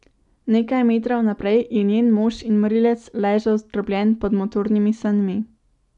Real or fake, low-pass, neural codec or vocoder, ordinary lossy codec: real; 10.8 kHz; none; none